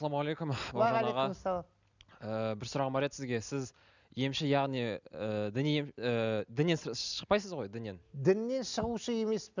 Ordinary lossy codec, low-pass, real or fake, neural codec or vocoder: none; 7.2 kHz; real; none